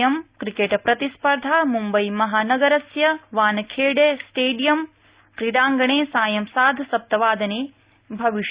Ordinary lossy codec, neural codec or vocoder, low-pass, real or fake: Opus, 64 kbps; none; 3.6 kHz; real